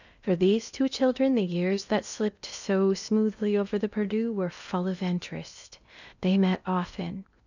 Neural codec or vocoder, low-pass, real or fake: codec, 16 kHz in and 24 kHz out, 0.8 kbps, FocalCodec, streaming, 65536 codes; 7.2 kHz; fake